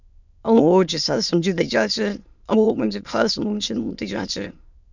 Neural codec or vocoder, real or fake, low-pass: autoencoder, 22.05 kHz, a latent of 192 numbers a frame, VITS, trained on many speakers; fake; 7.2 kHz